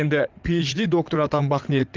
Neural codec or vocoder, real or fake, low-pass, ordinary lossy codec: codec, 16 kHz in and 24 kHz out, 2.2 kbps, FireRedTTS-2 codec; fake; 7.2 kHz; Opus, 24 kbps